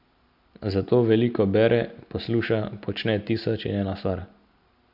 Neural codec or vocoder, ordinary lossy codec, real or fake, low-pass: none; MP3, 48 kbps; real; 5.4 kHz